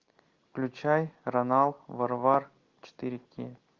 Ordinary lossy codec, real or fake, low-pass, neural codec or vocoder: Opus, 32 kbps; real; 7.2 kHz; none